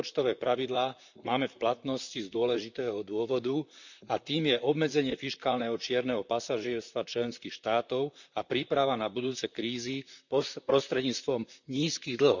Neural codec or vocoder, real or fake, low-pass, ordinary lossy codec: vocoder, 44.1 kHz, 128 mel bands, Pupu-Vocoder; fake; 7.2 kHz; none